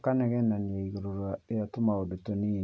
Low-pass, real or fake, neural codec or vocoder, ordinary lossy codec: none; real; none; none